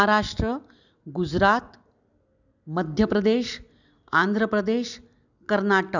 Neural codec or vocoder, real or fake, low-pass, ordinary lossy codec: none; real; 7.2 kHz; none